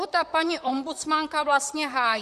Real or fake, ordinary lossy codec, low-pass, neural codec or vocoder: fake; Opus, 64 kbps; 14.4 kHz; vocoder, 44.1 kHz, 128 mel bands, Pupu-Vocoder